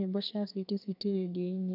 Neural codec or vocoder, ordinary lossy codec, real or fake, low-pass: codec, 32 kHz, 1.9 kbps, SNAC; none; fake; 5.4 kHz